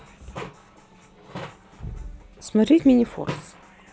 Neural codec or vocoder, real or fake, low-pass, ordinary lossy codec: none; real; none; none